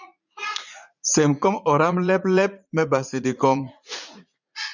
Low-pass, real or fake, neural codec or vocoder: 7.2 kHz; fake; vocoder, 44.1 kHz, 80 mel bands, Vocos